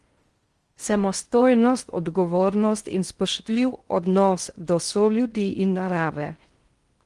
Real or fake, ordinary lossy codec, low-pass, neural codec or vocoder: fake; Opus, 24 kbps; 10.8 kHz; codec, 16 kHz in and 24 kHz out, 0.6 kbps, FocalCodec, streaming, 4096 codes